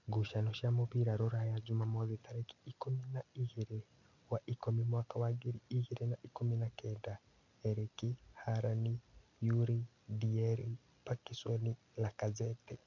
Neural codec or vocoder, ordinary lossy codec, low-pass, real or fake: none; none; 7.2 kHz; real